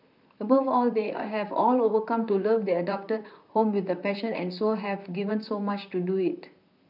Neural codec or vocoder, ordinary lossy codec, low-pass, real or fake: vocoder, 44.1 kHz, 128 mel bands, Pupu-Vocoder; none; 5.4 kHz; fake